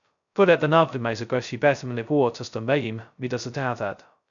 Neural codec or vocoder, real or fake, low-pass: codec, 16 kHz, 0.2 kbps, FocalCodec; fake; 7.2 kHz